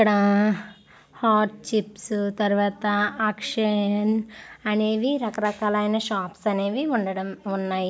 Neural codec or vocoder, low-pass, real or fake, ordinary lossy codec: none; none; real; none